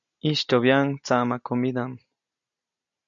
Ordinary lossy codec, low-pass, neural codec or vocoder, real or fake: MP3, 96 kbps; 7.2 kHz; none; real